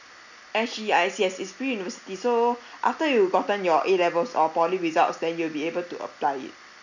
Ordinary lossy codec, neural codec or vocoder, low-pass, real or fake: none; none; 7.2 kHz; real